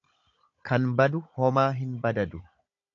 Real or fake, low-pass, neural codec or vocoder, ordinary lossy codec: fake; 7.2 kHz; codec, 16 kHz, 16 kbps, FunCodec, trained on Chinese and English, 50 frames a second; AAC, 48 kbps